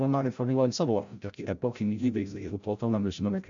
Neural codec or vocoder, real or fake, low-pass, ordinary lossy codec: codec, 16 kHz, 0.5 kbps, FreqCodec, larger model; fake; 7.2 kHz; MP3, 64 kbps